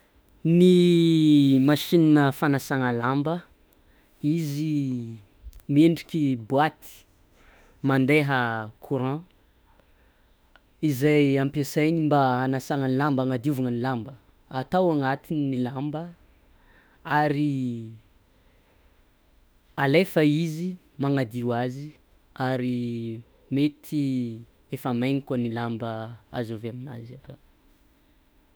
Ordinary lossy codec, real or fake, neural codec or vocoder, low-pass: none; fake; autoencoder, 48 kHz, 32 numbers a frame, DAC-VAE, trained on Japanese speech; none